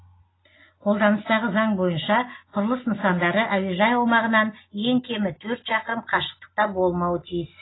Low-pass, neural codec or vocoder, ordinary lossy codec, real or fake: 7.2 kHz; none; AAC, 16 kbps; real